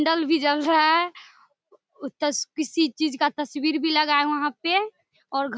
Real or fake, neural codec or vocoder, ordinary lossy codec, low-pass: real; none; none; none